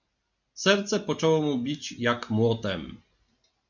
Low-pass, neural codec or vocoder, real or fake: 7.2 kHz; none; real